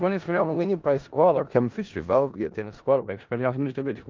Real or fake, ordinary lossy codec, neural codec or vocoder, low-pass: fake; Opus, 24 kbps; codec, 16 kHz in and 24 kHz out, 0.4 kbps, LongCat-Audio-Codec, four codebook decoder; 7.2 kHz